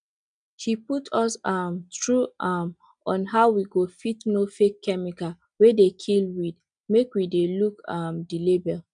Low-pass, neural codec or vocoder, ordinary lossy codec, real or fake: 9.9 kHz; none; none; real